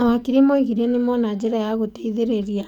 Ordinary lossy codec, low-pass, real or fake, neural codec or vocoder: none; 19.8 kHz; fake; codec, 44.1 kHz, 7.8 kbps, DAC